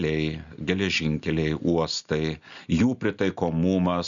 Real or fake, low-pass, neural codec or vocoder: real; 7.2 kHz; none